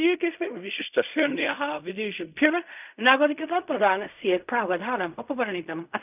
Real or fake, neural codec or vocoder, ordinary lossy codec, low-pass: fake; codec, 16 kHz in and 24 kHz out, 0.4 kbps, LongCat-Audio-Codec, fine tuned four codebook decoder; none; 3.6 kHz